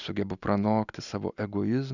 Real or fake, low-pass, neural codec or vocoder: real; 7.2 kHz; none